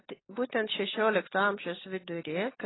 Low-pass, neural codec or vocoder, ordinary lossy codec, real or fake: 7.2 kHz; none; AAC, 16 kbps; real